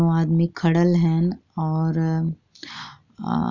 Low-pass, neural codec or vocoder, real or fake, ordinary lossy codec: 7.2 kHz; none; real; none